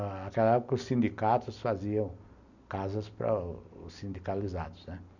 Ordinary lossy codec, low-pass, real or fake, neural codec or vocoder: none; 7.2 kHz; real; none